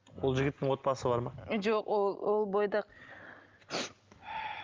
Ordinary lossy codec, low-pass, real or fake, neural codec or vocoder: Opus, 32 kbps; 7.2 kHz; real; none